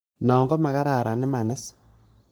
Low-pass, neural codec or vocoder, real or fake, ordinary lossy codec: none; codec, 44.1 kHz, 3.4 kbps, Pupu-Codec; fake; none